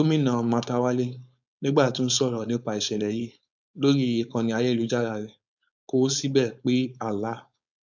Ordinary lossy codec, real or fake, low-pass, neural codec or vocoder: none; fake; 7.2 kHz; codec, 16 kHz, 4.8 kbps, FACodec